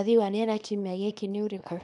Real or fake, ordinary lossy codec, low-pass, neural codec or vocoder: fake; none; 10.8 kHz; codec, 24 kHz, 0.9 kbps, WavTokenizer, small release